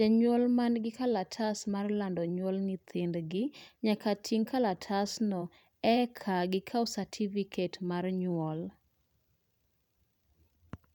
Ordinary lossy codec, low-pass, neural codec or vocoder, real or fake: none; 19.8 kHz; vocoder, 44.1 kHz, 128 mel bands every 512 samples, BigVGAN v2; fake